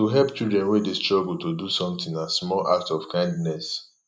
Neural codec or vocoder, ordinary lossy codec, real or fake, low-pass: none; none; real; none